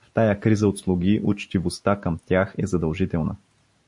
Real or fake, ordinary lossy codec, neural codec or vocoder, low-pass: real; MP3, 48 kbps; none; 10.8 kHz